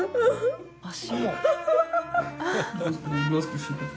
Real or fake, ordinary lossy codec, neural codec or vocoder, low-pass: real; none; none; none